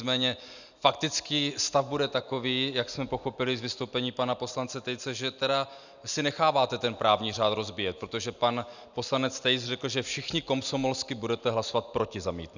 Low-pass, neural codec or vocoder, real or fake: 7.2 kHz; none; real